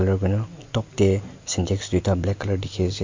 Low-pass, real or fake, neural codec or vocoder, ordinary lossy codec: 7.2 kHz; real; none; MP3, 48 kbps